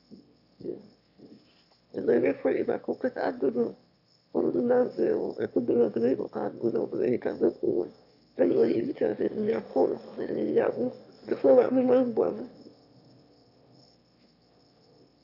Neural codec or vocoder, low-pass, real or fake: autoencoder, 22.05 kHz, a latent of 192 numbers a frame, VITS, trained on one speaker; 5.4 kHz; fake